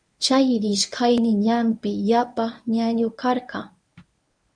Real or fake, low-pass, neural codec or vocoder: fake; 9.9 kHz; codec, 24 kHz, 0.9 kbps, WavTokenizer, medium speech release version 1